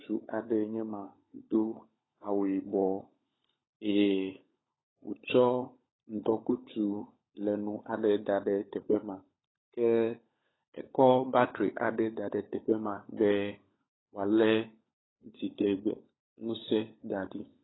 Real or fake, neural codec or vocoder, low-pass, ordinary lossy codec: fake; codec, 16 kHz, 16 kbps, FunCodec, trained on LibriTTS, 50 frames a second; 7.2 kHz; AAC, 16 kbps